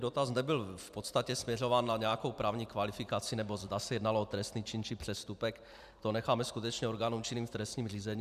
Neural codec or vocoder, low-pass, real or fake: vocoder, 44.1 kHz, 128 mel bands every 256 samples, BigVGAN v2; 14.4 kHz; fake